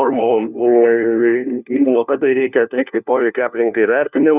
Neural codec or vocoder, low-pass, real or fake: codec, 16 kHz, 1 kbps, FunCodec, trained on LibriTTS, 50 frames a second; 3.6 kHz; fake